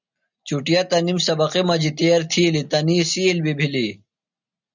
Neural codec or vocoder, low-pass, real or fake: none; 7.2 kHz; real